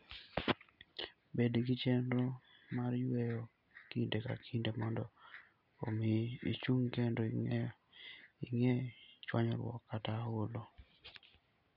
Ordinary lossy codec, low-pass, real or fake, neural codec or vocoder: none; 5.4 kHz; real; none